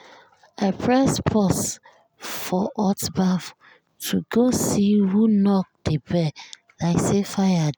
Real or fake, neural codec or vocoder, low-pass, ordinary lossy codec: real; none; none; none